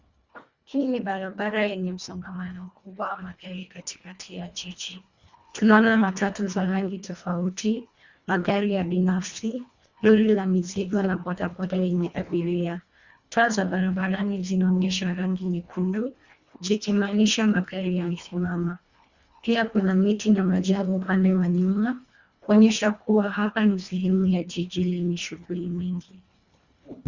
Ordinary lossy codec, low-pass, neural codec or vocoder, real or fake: Opus, 64 kbps; 7.2 kHz; codec, 24 kHz, 1.5 kbps, HILCodec; fake